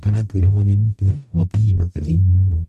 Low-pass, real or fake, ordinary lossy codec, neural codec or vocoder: 14.4 kHz; fake; none; codec, 44.1 kHz, 0.9 kbps, DAC